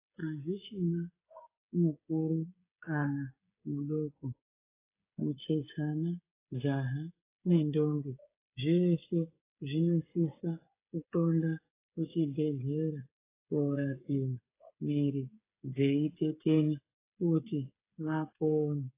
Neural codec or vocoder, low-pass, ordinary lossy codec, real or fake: codec, 16 kHz, 8 kbps, FreqCodec, smaller model; 3.6 kHz; AAC, 24 kbps; fake